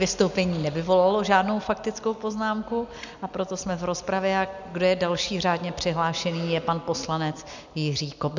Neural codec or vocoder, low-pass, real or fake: none; 7.2 kHz; real